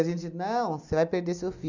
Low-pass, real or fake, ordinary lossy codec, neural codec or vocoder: 7.2 kHz; real; none; none